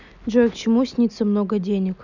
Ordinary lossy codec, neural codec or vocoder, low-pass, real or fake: none; none; 7.2 kHz; real